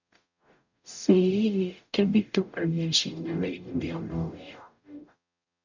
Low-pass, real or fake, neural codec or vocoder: 7.2 kHz; fake; codec, 44.1 kHz, 0.9 kbps, DAC